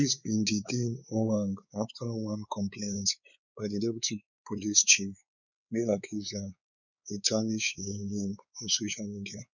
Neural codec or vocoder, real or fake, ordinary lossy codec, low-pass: codec, 16 kHz, 4 kbps, X-Codec, WavLM features, trained on Multilingual LibriSpeech; fake; none; 7.2 kHz